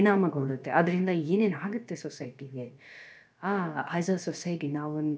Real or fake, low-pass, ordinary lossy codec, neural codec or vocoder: fake; none; none; codec, 16 kHz, about 1 kbps, DyCAST, with the encoder's durations